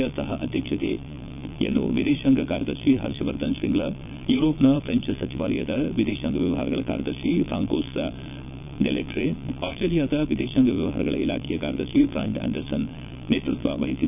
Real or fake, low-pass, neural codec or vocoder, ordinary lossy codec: fake; 3.6 kHz; vocoder, 22.05 kHz, 80 mel bands, Vocos; none